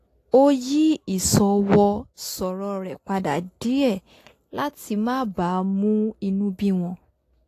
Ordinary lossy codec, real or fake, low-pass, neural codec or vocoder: AAC, 48 kbps; real; 14.4 kHz; none